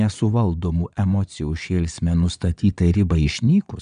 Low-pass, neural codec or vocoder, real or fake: 9.9 kHz; vocoder, 22.05 kHz, 80 mel bands, Vocos; fake